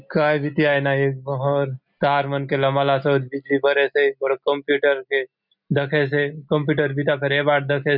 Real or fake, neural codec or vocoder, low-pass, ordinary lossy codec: real; none; 5.4 kHz; none